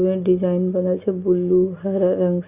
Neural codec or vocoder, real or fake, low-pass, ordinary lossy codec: none; real; 3.6 kHz; Opus, 64 kbps